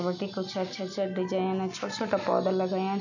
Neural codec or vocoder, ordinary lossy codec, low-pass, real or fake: none; none; 7.2 kHz; real